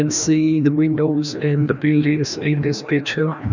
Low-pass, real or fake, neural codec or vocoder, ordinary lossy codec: 7.2 kHz; fake; codec, 16 kHz, 1 kbps, FreqCodec, larger model; none